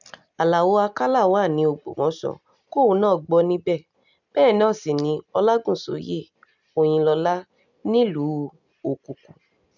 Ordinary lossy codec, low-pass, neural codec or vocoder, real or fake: none; 7.2 kHz; none; real